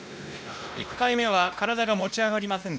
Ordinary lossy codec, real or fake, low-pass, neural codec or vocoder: none; fake; none; codec, 16 kHz, 1 kbps, X-Codec, WavLM features, trained on Multilingual LibriSpeech